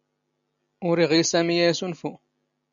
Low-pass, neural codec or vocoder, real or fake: 7.2 kHz; none; real